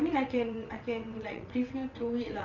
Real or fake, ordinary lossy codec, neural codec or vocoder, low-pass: fake; none; vocoder, 22.05 kHz, 80 mel bands, WaveNeXt; 7.2 kHz